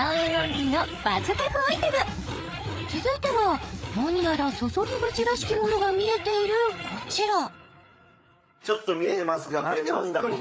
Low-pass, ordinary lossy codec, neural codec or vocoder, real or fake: none; none; codec, 16 kHz, 4 kbps, FreqCodec, larger model; fake